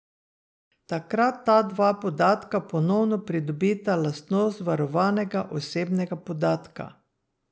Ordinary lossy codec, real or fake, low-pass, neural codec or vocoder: none; real; none; none